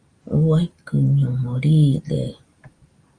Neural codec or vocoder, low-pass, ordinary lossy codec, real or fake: none; 9.9 kHz; Opus, 32 kbps; real